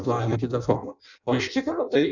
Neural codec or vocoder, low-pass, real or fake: codec, 16 kHz in and 24 kHz out, 0.6 kbps, FireRedTTS-2 codec; 7.2 kHz; fake